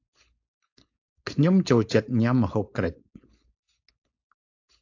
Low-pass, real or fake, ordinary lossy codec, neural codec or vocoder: 7.2 kHz; fake; AAC, 48 kbps; codec, 16 kHz, 4.8 kbps, FACodec